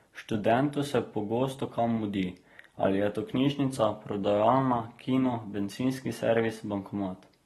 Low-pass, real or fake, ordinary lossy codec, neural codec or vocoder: 19.8 kHz; real; AAC, 32 kbps; none